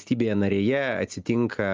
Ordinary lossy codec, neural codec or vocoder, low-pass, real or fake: Opus, 24 kbps; none; 7.2 kHz; real